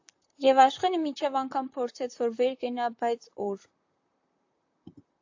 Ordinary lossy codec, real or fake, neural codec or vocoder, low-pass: AAC, 48 kbps; fake; vocoder, 44.1 kHz, 128 mel bands, Pupu-Vocoder; 7.2 kHz